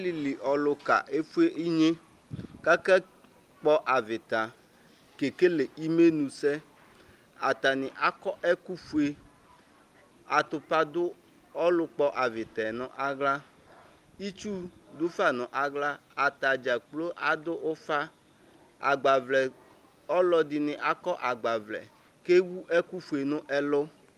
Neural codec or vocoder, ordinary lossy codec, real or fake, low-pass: none; Opus, 32 kbps; real; 14.4 kHz